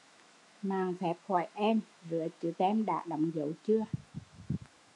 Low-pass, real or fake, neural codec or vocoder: 10.8 kHz; fake; autoencoder, 48 kHz, 128 numbers a frame, DAC-VAE, trained on Japanese speech